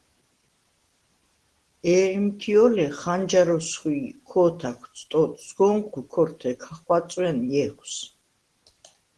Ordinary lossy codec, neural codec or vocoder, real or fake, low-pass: Opus, 16 kbps; none; real; 10.8 kHz